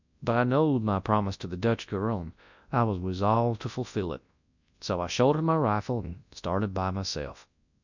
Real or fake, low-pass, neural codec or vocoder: fake; 7.2 kHz; codec, 24 kHz, 0.9 kbps, WavTokenizer, large speech release